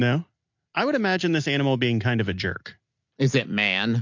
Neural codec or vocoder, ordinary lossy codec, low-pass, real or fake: none; MP3, 48 kbps; 7.2 kHz; real